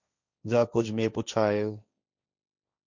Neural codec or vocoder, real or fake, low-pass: codec, 16 kHz, 1.1 kbps, Voila-Tokenizer; fake; 7.2 kHz